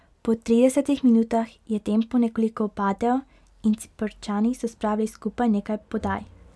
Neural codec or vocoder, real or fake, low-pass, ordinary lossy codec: none; real; none; none